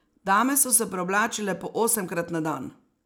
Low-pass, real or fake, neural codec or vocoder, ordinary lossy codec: none; real; none; none